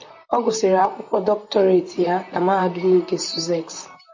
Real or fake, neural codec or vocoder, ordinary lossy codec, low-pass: fake; vocoder, 44.1 kHz, 128 mel bands, Pupu-Vocoder; MP3, 48 kbps; 7.2 kHz